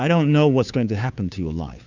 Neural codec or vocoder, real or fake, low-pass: codec, 16 kHz, 2 kbps, FunCodec, trained on Chinese and English, 25 frames a second; fake; 7.2 kHz